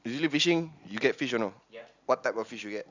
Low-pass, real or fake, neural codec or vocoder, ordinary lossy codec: 7.2 kHz; real; none; Opus, 64 kbps